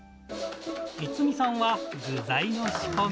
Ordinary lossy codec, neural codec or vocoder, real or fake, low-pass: none; none; real; none